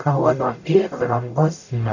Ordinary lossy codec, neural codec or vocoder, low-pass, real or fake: none; codec, 44.1 kHz, 0.9 kbps, DAC; 7.2 kHz; fake